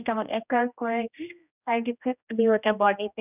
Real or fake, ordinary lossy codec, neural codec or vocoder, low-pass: fake; none; codec, 16 kHz, 1 kbps, X-Codec, HuBERT features, trained on general audio; 3.6 kHz